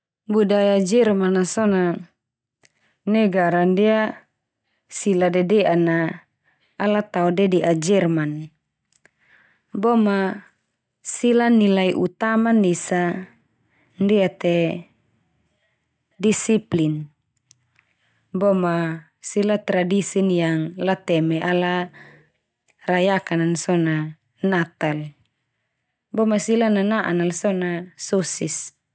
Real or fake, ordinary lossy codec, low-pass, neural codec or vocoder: real; none; none; none